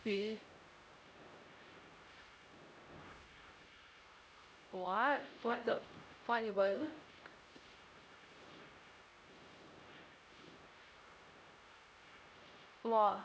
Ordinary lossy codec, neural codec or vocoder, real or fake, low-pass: none; codec, 16 kHz, 0.5 kbps, X-Codec, HuBERT features, trained on LibriSpeech; fake; none